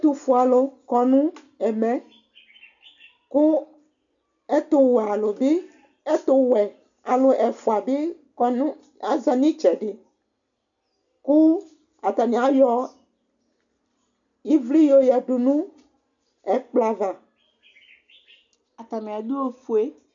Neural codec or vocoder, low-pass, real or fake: none; 7.2 kHz; real